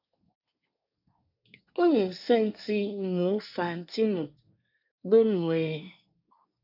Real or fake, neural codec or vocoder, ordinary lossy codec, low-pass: fake; codec, 24 kHz, 1 kbps, SNAC; AAC, 48 kbps; 5.4 kHz